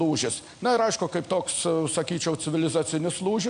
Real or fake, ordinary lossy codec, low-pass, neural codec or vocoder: real; AAC, 64 kbps; 9.9 kHz; none